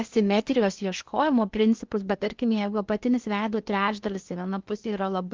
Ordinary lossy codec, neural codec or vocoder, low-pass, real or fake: Opus, 32 kbps; codec, 16 kHz in and 24 kHz out, 0.8 kbps, FocalCodec, streaming, 65536 codes; 7.2 kHz; fake